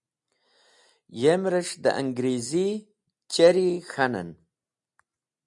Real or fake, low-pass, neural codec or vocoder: real; 10.8 kHz; none